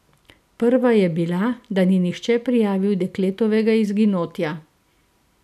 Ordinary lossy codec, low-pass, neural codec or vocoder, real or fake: none; 14.4 kHz; none; real